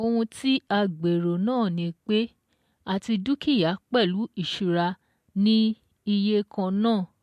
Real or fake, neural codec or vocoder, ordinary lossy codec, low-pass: real; none; MP3, 64 kbps; 14.4 kHz